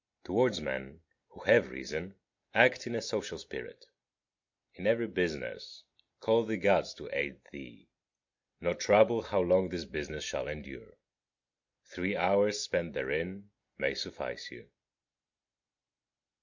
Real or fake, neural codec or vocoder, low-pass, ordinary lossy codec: real; none; 7.2 kHz; MP3, 48 kbps